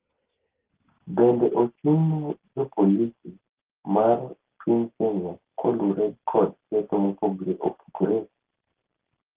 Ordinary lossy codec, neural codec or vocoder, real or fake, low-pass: Opus, 16 kbps; none; real; 3.6 kHz